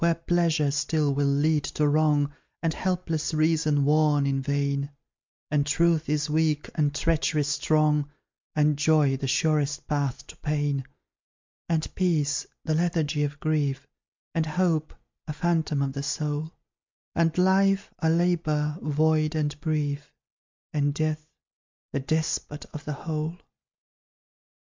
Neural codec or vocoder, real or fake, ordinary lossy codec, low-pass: none; real; MP3, 64 kbps; 7.2 kHz